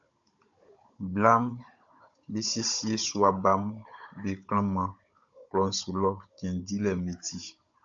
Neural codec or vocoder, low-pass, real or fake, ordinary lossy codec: codec, 16 kHz, 16 kbps, FunCodec, trained on Chinese and English, 50 frames a second; 7.2 kHz; fake; AAC, 64 kbps